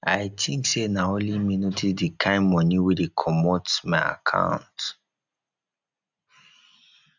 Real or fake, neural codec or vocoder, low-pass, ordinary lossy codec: real; none; 7.2 kHz; none